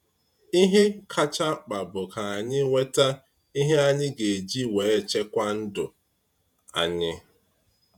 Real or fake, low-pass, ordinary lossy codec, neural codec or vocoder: fake; 19.8 kHz; none; vocoder, 48 kHz, 128 mel bands, Vocos